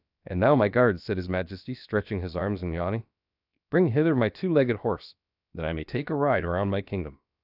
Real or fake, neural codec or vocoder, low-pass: fake; codec, 16 kHz, about 1 kbps, DyCAST, with the encoder's durations; 5.4 kHz